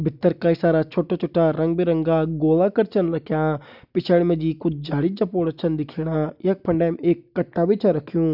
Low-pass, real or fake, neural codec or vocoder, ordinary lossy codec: 5.4 kHz; real; none; none